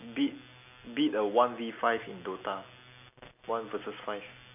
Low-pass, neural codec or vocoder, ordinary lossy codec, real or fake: 3.6 kHz; none; none; real